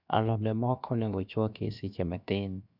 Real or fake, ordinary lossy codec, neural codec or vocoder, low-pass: fake; none; codec, 16 kHz, 0.7 kbps, FocalCodec; 5.4 kHz